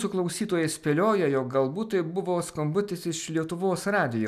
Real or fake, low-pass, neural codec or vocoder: real; 14.4 kHz; none